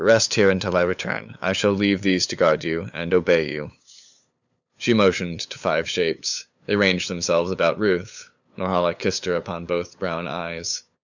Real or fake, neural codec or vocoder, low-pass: fake; codec, 16 kHz, 8 kbps, FunCodec, trained on LibriTTS, 25 frames a second; 7.2 kHz